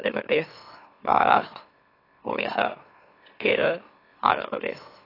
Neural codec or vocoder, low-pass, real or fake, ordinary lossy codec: autoencoder, 44.1 kHz, a latent of 192 numbers a frame, MeloTTS; 5.4 kHz; fake; AAC, 24 kbps